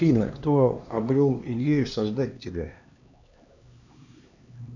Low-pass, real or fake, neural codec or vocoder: 7.2 kHz; fake; codec, 16 kHz, 2 kbps, X-Codec, HuBERT features, trained on LibriSpeech